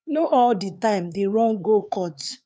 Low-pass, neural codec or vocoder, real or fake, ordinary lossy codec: none; codec, 16 kHz, 4 kbps, X-Codec, HuBERT features, trained on LibriSpeech; fake; none